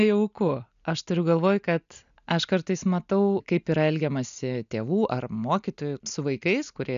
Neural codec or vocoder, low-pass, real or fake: none; 7.2 kHz; real